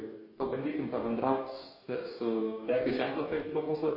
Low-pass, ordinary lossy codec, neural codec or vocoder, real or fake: 5.4 kHz; MP3, 24 kbps; codec, 44.1 kHz, 2.6 kbps, DAC; fake